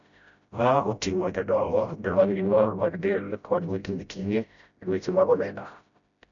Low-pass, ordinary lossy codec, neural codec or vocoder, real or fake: 7.2 kHz; none; codec, 16 kHz, 0.5 kbps, FreqCodec, smaller model; fake